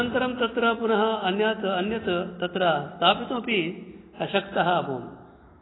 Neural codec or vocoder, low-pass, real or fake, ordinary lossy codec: none; 7.2 kHz; real; AAC, 16 kbps